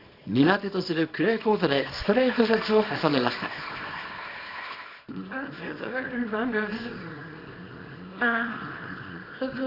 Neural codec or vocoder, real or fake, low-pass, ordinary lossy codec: codec, 24 kHz, 0.9 kbps, WavTokenizer, small release; fake; 5.4 kHz; AAC, 32 kbps